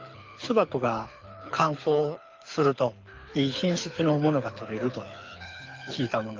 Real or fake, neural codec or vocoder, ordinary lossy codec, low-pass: fake; codec, 24 kHz, 6 kbps, HILCodec; Opus, 24 kbps; 7.2 kHz